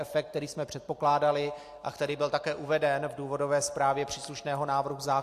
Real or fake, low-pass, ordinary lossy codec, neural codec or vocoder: real; 14.4 kHz; AAC, 64 kbps; none